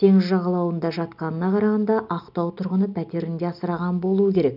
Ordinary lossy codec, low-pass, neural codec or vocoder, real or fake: none; 5.4 kHz; none; real